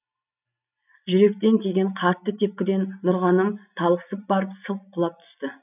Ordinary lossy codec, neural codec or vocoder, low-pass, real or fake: none; none; 3.6 kHz; real